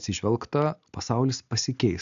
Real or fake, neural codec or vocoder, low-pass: real; none; 7.2 kHz